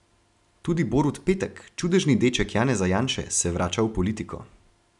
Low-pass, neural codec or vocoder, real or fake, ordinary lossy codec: 10.8 kHz; none; real; none